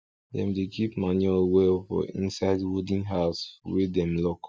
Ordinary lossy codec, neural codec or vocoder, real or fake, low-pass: none; none; real; none